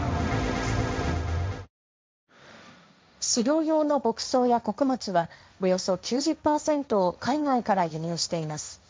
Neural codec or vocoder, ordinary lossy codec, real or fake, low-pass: codec, 16 kHz, 1.1 kbps, Voila-Tokenizer; none; fake; none